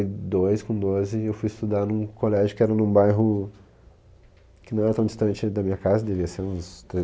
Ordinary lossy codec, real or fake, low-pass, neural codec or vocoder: none; real; none; none